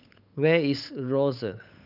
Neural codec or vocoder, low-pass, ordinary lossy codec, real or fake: codec, 16 kHz, 8 kbps, FunCodec, trained on Chinese and English, 25 frames a second; 5.4 kHz; none; fake